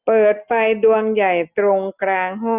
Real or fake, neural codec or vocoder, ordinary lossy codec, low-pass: real; none; none; 3.6 kHz